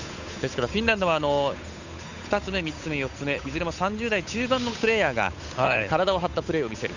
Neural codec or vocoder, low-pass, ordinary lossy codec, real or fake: codec, 16 kHz, 8 kbps, FunCodec, trained on Chinese and English, 25 frames a second; 7.2 kHz; none; fake